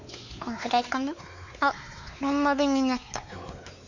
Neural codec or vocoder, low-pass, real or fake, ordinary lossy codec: codec, 16 kHz, 4 kbps, X-Codec, WavLM features, trained on Multilingual LibriSpeech; 7.2 kHz; fake; none